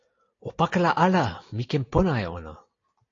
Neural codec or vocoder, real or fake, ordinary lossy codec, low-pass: none; real; AAC, 32 kbps; 7.2 kHz